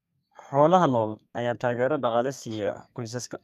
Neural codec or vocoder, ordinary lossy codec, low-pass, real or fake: codec, 32 kHz, 1.9 kbps, SNAC; none; 14.4 kHz; fake